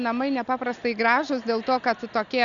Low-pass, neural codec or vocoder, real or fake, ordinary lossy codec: 7.2 kHz; none; real; Opus, 64 kbps